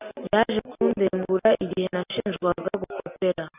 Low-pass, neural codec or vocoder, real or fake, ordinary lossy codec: 3.6 kHz; none; real; AAC, 32 kbps